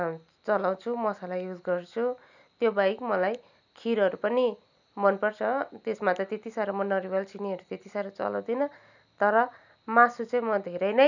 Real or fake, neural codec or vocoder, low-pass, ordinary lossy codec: real; none; 7.2 kHz; none